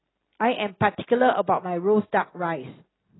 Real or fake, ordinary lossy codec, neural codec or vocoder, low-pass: real; AAC, 16 kbps; none; 7.2 kHz